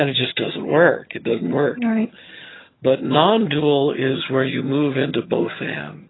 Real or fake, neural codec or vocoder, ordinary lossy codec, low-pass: fake; vocoder, 22.05 kHz, 80 mel bands, HiFi-GAN; AAC, 16 kbps; 7.2 kHz